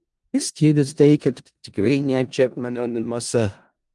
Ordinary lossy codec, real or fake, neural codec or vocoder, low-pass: Opus, 32 kbps; fake; codec, 16 kHz in and 24 kHz out, 0.4 kbps, LongCat-Audio-Codec, four codebook decoder; 10.8 kHz